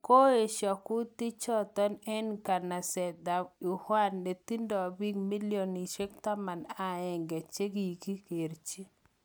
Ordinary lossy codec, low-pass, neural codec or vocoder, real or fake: none; none; none; real